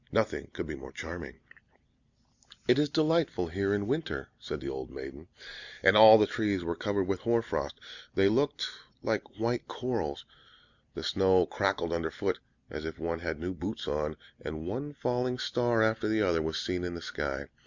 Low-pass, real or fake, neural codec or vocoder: 7.2 kHz; real; none